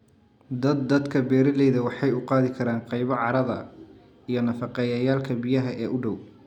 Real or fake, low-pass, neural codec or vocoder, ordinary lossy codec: real; 19.8 kHz; none; none